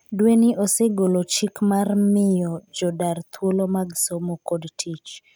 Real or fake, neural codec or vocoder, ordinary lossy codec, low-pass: real; none; none; none